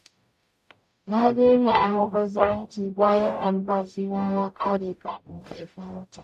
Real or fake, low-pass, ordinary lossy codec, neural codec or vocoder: fake; 14.4 kHz; AAC, 96 kbps; codec, 44.1 kHz, 0.9 kbps, DAC